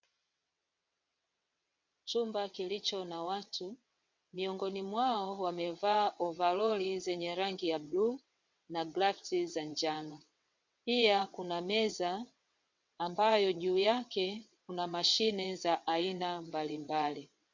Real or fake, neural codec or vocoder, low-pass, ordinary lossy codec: fake; vocoder, 22.05 kHz, 80 mel bands, WaveNeXt; 7.2 kHz; MP3, 64 kbps